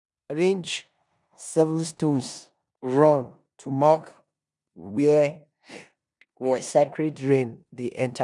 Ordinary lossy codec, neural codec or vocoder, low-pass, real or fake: none; codec, 16 kHz in and 24 kHz out, 0.9 kbps, LongCat-Audio-Codec, four codebook decoder; 10.8 kHz; fake